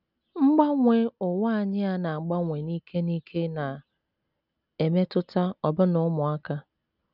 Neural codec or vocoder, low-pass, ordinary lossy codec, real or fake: none; 5.4 kHz; none; real